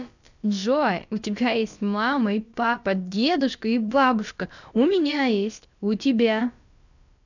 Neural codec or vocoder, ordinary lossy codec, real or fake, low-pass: codec, 16 kHz, about 1 kbps, DyCAST, with the encoder's durations; none; fake; 7.2 kHz